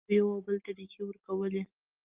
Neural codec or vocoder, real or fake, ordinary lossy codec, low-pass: none; real; Opus, 24 kbps; 3.6 kHz